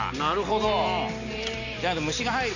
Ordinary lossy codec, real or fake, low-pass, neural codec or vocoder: none; real; 7.2 kHz; none